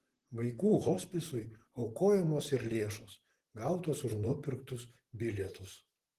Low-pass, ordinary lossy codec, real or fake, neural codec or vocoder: 14.4 kHz; Opus, 16 kbps; fake; vocoder, 44.1 kHz, 128 mel bands, Pupu-Vocoder